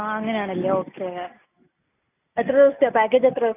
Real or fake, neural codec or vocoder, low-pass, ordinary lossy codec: real; none; 3.6 kHz; none